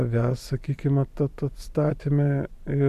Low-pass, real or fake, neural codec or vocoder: 14.4 kHz; fake; vocoder, 44.1 kHz, 128 mel bands every 512 samples, BigVGAN v2